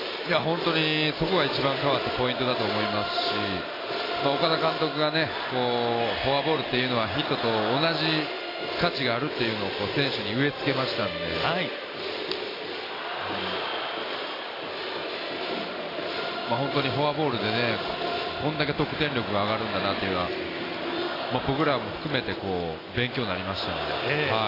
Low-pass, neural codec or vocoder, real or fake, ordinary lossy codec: 5.4 kHz; none; real; AAC, 24 kbps